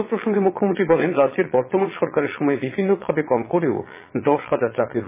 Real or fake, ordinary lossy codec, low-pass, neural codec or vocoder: fake; MP3, 16 kbps; 3.6 kHz; vocoder, 22.05 kHz, 80 mel bands, Vocos